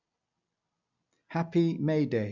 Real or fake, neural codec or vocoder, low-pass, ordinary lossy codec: real; none; 7.2 kHz; none